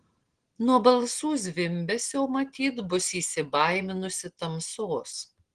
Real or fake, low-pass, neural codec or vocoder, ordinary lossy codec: real; 9.9 kHz; none; Opus, 16 kbps